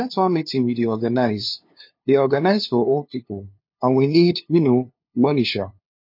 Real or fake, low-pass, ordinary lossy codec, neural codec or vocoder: fake; 5.4 kHz; MP3, 32 kbps; codec, 16 kHz, 2 kbps, FunCodec, trained on LibriTTS, 25 frames a second